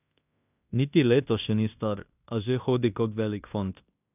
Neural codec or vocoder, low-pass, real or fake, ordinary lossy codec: codec, 16 kHz in and 24 kHz out, 0.9 kbps, LongCat-Audio-Codec, fine tuned four codebook decoder; 3.6 kHz; fake; none